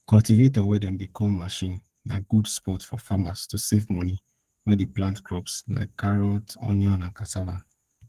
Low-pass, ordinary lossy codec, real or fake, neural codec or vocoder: 14.4 kHz; Opus, 24 kbps; fake; codec, 32 kHz, 1.9 kbps, SNAC